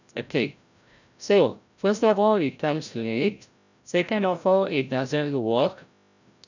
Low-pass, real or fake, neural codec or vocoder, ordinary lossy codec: 7.2 kHz; fake; codec, 16 kHz, 0.5 kbps, FreqCodec, larger model; none